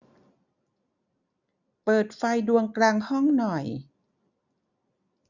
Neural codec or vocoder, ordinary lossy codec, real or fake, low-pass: none; none; real; 7.2 kHz